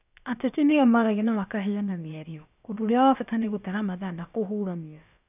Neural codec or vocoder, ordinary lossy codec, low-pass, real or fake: codec, 16 kHz, about 1 kbps, DyCAST, with the encoder's durations; none; 3.6 kHz; fake